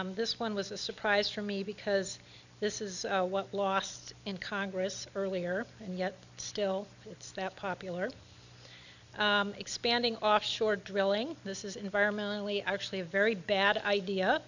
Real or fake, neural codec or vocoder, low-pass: real; none; 7.2 kHz